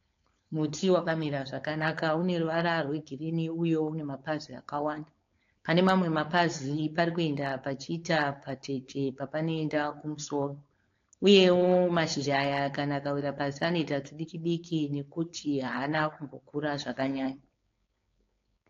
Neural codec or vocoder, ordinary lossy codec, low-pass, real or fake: codec, 16 kHz, 4.8 kbps, FACodec; AAC, 48 kbps; 7.2 kHz; fake